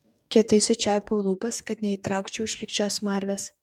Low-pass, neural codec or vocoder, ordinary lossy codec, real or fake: 19.8 kHz; codec, 44.1 kHz, 2.6 kbps, DAC; MP3, 96 kbps; fake